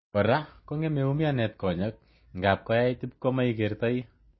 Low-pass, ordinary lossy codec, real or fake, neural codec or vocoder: 7.2 kHz; MP3, 24 kbps; real; none